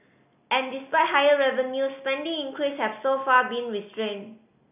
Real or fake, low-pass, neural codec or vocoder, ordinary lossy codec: real; 3.6 kHz; none; none